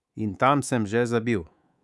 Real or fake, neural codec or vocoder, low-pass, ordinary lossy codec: fake; codec, 24 kHz, 3.1 kbps, DualCodec; none; none